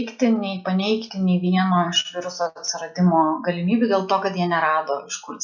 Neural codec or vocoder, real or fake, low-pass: none; real; 7.2 kHz